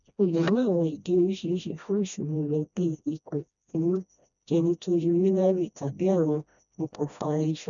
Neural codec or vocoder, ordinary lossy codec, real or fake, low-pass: codec, 16 kHz, 1 kbps, FreqCodec, smaller model; none; fake; 7.2 kHz